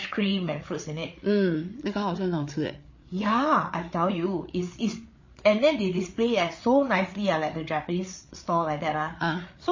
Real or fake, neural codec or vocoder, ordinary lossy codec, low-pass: fake; codec, 16 kHz, 8 kbps, FreqCodec, larger model; MP3, 32 kbps; 7.2 kHz